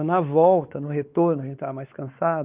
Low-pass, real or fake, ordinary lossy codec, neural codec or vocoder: 3.6 kHz; fake; Opus, 24 kbps; codec, 16 kHz, 4 kbps, X-Codec, WavLM features, trained on Multilingual LibriSpeech